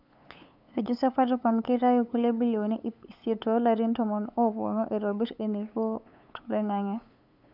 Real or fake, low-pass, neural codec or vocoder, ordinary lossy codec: fake; 5.4 kHz; codec, 16 kHz, 8 kbps, FunCodec, trained on LibriTTS, 25 frames a second; none